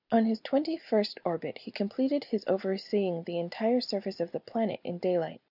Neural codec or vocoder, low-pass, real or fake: none; 5.4 kHz; real